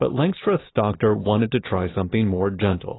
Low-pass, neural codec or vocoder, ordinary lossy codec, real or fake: 7.2 kHz; none; AAC, 16 kbps; real